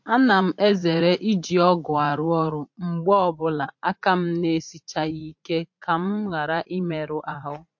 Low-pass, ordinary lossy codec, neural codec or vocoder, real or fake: 7.2 kHz; MP3, 48 kbps; vocoder, 44.1 kHz, 80 mel bands, Vocos; fake